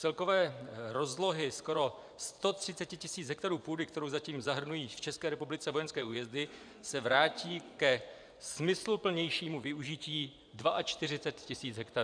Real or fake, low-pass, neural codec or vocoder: fake; 9.9 kHz; vocoder, 24 kHz, 100 mel bands, Vocos